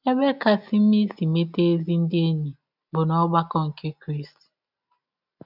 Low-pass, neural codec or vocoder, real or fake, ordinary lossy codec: 5.4 kHz; none; real; none